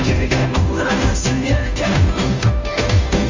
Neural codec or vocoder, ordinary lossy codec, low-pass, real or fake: codec, 16 kHz, 0.5 kbps, FunCodec, trained on Chinese and English, 25 frames a second; Opus, 32 kbps; 7.2 kHz; fake